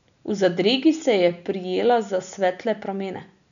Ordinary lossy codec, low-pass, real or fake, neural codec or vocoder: none; 7.2 kHz; real; none